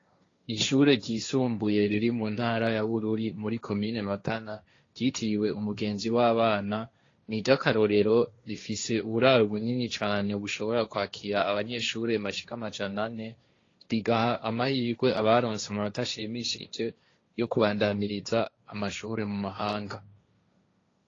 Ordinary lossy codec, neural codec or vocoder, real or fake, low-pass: AAC, 32 kbps; codec, 16 kHz, 1.1 kbps, Voila-Tokenizer; fake; 7.2 kHz